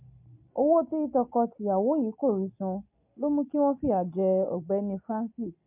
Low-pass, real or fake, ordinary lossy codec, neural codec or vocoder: 3.6 kHz; real; MP3, 32 kbps; none